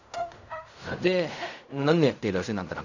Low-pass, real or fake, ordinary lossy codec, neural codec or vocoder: 7.2 kHz; fake; none; codec, 16 kHz in and 24 kHz out, 0.4 kbps, LongCat-Audio-Codec, fine tuned four codebook decoder